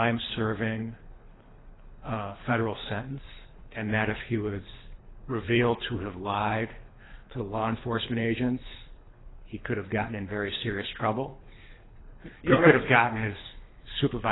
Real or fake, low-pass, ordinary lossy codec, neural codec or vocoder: fake; 7.2 kHz; AAC, 16 kbps; codec, 24 kHz, 3 kbps, HILCodec